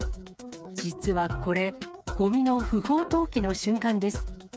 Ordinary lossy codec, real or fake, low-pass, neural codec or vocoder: none; fake; none; codec, 16 kHz, 4 kbps, FreqCodec, smaller model